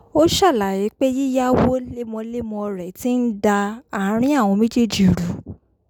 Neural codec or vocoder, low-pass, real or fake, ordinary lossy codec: none; 19.8 kHz; real; none